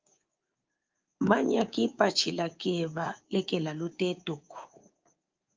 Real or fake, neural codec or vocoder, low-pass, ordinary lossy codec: fake; codec, 24 kHz, 3.1 kbps, DualCodec; 7.2 kHz; Opus, 24 kbps